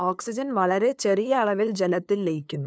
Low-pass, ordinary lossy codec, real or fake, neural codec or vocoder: none; none; fake; codec, 16 kHz, 2 kbps, FunCodec, trained on LibriTTS, 25 frames a second